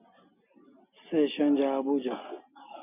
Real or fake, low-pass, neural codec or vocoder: real; 3.6 kHz; none